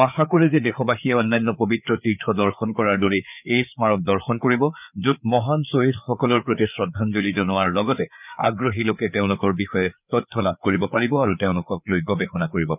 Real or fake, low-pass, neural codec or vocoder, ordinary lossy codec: fake; 3.6 kHz; codec, 16 kHz, 4 kbps, FreqCodec, larger model; none